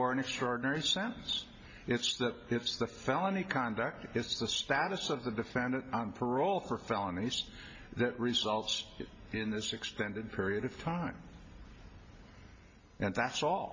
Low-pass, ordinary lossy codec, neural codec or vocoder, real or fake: 7.2 kHz; MP3, 32 kbps; none; real